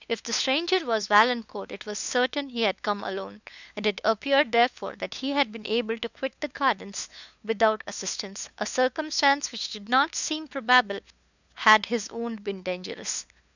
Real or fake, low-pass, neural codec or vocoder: fake; 7.2 kHz; codec, 16 kHz, 2 kbps, FunCodec, trained on LibriTTS, 25 frames a second